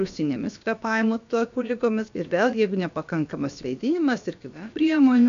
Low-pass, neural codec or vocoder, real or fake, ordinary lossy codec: 7.2 kHz; codec, 16 kHz, about 1 kbps, DyCAST, with the encoder's durations; fake; MP3, 64 kbps